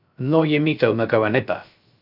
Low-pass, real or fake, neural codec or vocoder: 5.4 kHz; fake; codec, 16 kHz, 0.3 kbps, FocalCodec